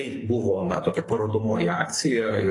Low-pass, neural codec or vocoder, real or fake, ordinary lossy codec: 10.8 kHz; codec, 44.1 kHz, 2.6 kbps, SNAC; fake; AAC, 32 kbps